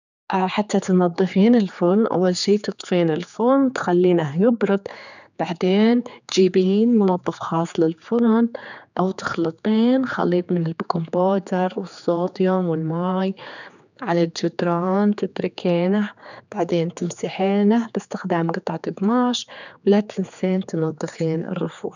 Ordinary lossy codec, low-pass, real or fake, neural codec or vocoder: none; 7.2 kHz; fake; codec, 16 kHz, 4 kbps, X-Codec, HuBERT features, trained on general audio